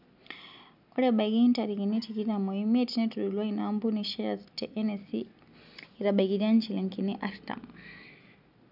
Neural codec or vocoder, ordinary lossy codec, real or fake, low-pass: none; none; real; 5.4 kHz